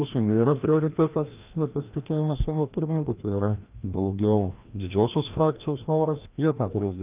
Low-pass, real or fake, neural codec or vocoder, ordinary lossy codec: 3.6 kHz; fake; codec, 16 kHz, 1 kbps, FreqCodec, larger model; Opus, 24 kbps